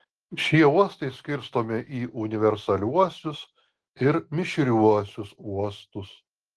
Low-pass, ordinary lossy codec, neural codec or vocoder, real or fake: 10.8 kHz; Opus, 16 kbps; none; real